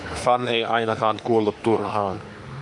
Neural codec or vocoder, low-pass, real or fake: autoencoder, 48 kHz, 32 numbers a frame, DAC-VAE, trained on Japanese speech; 10.8 kHz; fake